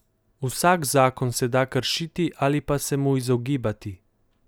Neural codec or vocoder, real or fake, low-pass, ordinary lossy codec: none; real; none; none